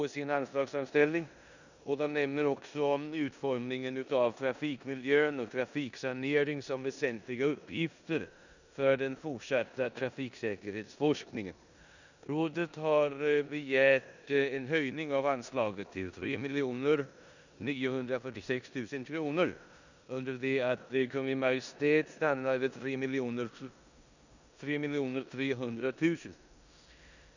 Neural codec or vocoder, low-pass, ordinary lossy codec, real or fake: codec, 16 kHz in and 24 kHz out, 0.9 kbps, LongCat-Audio-Codec, four codebook decoder; 7.2 kHz; none; fake